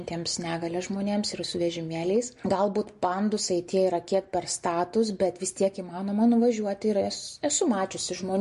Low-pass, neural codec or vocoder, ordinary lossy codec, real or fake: 14.4 kHz; none; MP3, 48 kbps; real